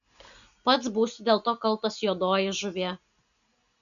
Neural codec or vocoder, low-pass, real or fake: none; 7.2 kHz; real